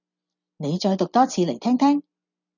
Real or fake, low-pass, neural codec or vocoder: real; 7.2 kHz; none